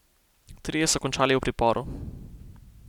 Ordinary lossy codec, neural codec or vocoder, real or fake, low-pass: none; none; real; 19.8 kHz